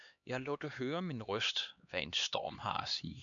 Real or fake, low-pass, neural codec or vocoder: fake; 7.2 kHz; codec, 16 kHz, 2 kbps, X-Codec, HuBERT features, trained on LibriSpeech